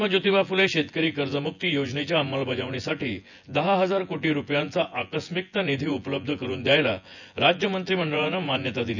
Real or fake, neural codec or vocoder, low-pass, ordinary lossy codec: fake; vocoder, 24 kHz, 100 mel bands, Vocos; 7.2 kHz; none